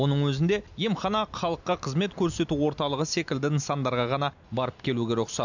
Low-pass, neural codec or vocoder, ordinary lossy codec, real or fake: 7.2 kHz; none; none; real